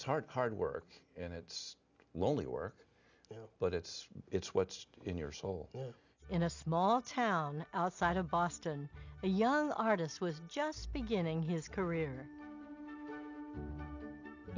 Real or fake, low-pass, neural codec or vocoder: real; 7.2 kHz; none